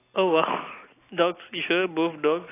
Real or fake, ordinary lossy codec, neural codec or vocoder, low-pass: real; AAC, 24 kbps; none; 3.6 kHz